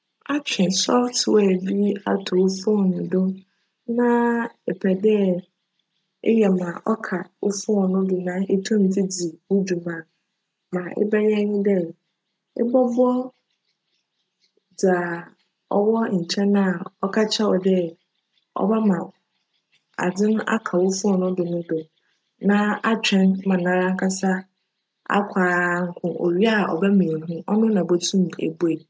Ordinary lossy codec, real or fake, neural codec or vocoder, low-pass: none; real; none; none